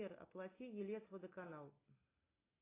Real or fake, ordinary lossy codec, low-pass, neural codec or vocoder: real; AAC, 16 kbps; 3.6 kHz; none